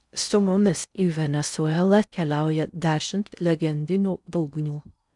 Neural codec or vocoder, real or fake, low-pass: codec, 16 kHz in and 24 kHz out, 0.6 kbps, FocalCodec, streaming, 4096 codes; fake; 10.8 kHz